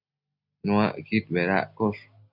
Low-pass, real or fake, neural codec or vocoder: 5.4 kHz; real; none